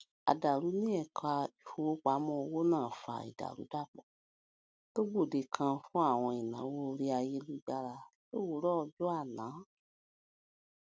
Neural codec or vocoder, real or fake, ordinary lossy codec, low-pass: none; real; none; none